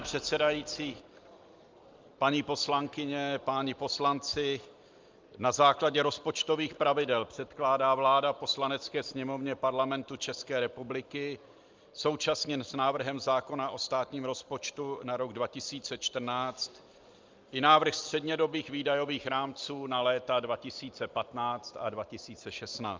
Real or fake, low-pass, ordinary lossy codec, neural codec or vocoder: real; 7.2 kHz; Opus, 24 kbps; none